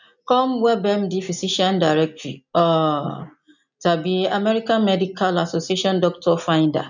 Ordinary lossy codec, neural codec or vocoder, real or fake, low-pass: none; none; real; 7.2 kHz